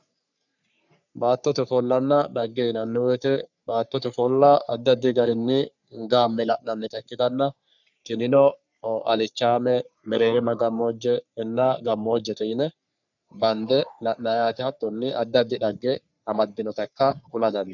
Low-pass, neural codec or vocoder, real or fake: 7.2 kHz; codec, 44.1 kHz, 3.4 kbps, Pupu-Codec; fake